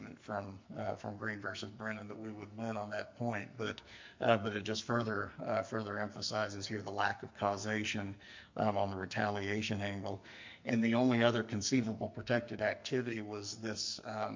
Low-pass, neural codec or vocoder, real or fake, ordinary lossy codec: 7.2 kHz; codec, 44.1 kHz, 2.6 kbps, SNAC; fake; MP3, 48 kbps